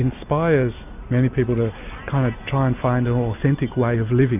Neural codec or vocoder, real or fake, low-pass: none; real; 3.6 kHz